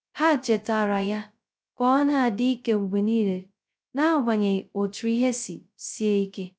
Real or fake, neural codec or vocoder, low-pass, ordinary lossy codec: fake; codec, 16 kHz, 0.2 kbps, FocalCodec; none; none